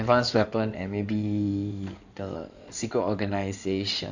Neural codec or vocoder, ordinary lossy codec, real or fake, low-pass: codec, 16 kHz in and 24 kHz out, 2.2 kbps, FireRedTTS-2 codec; none; fake; 7.2 kHz